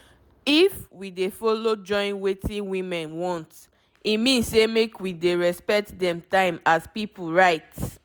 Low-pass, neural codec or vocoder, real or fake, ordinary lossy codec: none; none; real; none